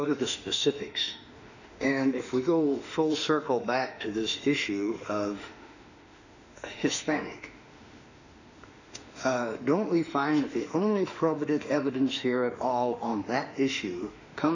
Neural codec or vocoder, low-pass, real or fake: autoencoder, 48 kHz, 32 numbers a frame, DAC-VAE, trained on Japanese speech; 7.2 kHz; fake